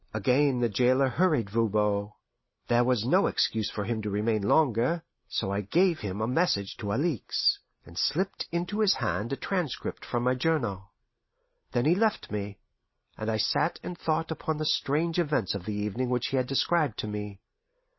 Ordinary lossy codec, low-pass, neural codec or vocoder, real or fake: MP3, 24 kbps; 7.2 kHz; none; real